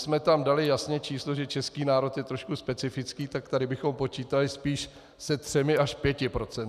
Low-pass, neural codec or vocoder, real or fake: 14.4 kHz; none; real